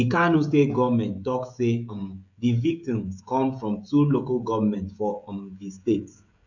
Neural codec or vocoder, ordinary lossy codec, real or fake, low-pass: codec, 16 kHz, 16 kbps, FreqCodec, smaller model; none; fake; 7.2 kHz